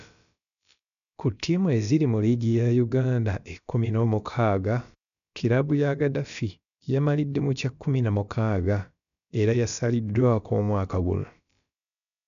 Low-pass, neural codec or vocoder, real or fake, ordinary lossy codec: 7.2 kHz; codec, 16 kHz, about 1 kbps, DyCAST, with the encoder's durations; fake; none